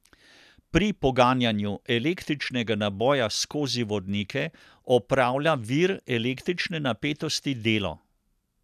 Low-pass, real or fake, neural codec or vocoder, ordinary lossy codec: 14.4 kHz; real; none; none